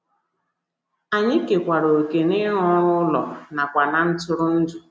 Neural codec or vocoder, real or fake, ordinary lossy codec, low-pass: none; real; none; none